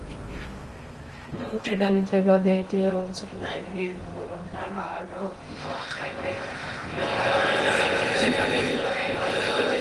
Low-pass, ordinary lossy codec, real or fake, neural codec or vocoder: 10.8 kHz; Opus, 24 kbps; fake; codec, 16 kHz in and 24 kHz out, 0.6 kbps, FocalCodec, streaming, 4096 codes